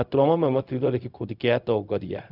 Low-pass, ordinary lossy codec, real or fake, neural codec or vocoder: 5.4 kHz; none; fake; codec, 16 kHz, 0.4 kbps, LongCat-Audio-Codec